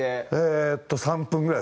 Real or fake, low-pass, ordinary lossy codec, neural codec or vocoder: real; none; none; none